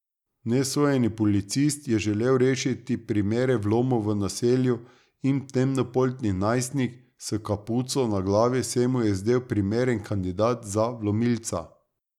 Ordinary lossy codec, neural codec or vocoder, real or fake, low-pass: none; none; real; 19.8 kHz